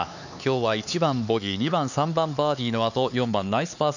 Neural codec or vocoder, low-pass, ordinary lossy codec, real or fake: codec, 16 kHz, 4 kbps, X-Codec, HuBERT features, trained on LibriSpeech; 7.2 kHz; none; fake